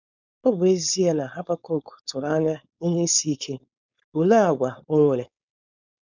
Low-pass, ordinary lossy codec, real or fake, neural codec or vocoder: 7.2 kHz; none; fake; codec, 16 kHz, 4.8 kbps, FACodec